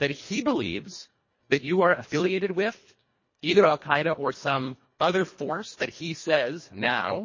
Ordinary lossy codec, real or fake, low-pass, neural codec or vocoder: MP3, 32 kbps; fake; 7.2 kHz; codec, 24 kHz, 1.5 kbps, HILCodec